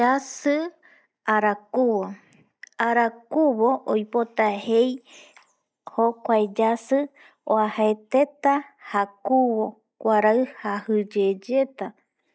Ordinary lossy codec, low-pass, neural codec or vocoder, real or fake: none; none; none; real